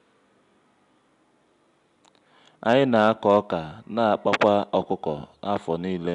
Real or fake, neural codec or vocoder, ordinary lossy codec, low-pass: real; none; none; 10.8 kHz